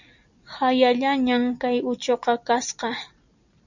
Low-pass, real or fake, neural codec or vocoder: 7.2 kHz; real; none